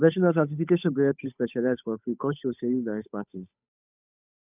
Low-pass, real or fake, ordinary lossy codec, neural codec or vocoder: 3.6 kHz; fake; none; codec, 16 kHz, 8 kbps, FunCodec, trained on Chinese and English, 25 frames a second